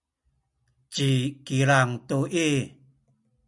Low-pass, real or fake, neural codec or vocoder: 10.8 kHz; real; none